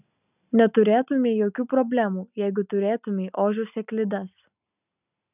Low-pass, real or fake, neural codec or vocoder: 3.6 kHz; real; none